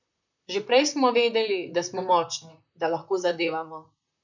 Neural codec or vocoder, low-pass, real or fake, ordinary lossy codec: vocoder, 44.1 kHz, 128 mel bands, Pupu-Vocoder; 7.2 kHz; fake; none